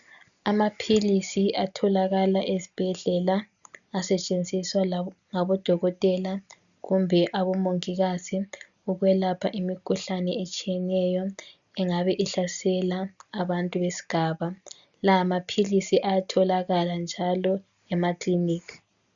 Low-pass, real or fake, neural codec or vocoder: 7.2 kHz; real; none